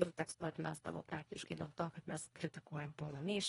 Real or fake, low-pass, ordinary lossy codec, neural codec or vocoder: fake; 10.8 kHz; AAC, 32 kbps; codec, 24 kHz, 1.5 kbps, HILCodec